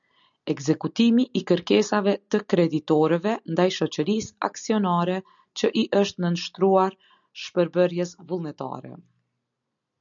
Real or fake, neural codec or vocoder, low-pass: real; none; 7.2 kHz